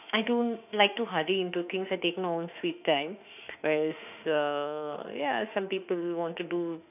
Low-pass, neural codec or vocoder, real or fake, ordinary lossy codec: 3.6 kHz; autoencoder, 48 kHz, 32 numbers a frame, DAC-VAE, trained on Japanese speech; fake; none